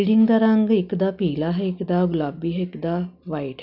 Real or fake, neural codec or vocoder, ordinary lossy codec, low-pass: real; none; none; 5.4 kHz